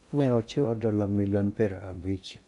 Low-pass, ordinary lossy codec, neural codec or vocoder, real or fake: 10.8 kHz; none; codec, 16 kHz in and 24 kHz out, 0.6 kbps, FocalCodec, streaming, 2048 codes; fake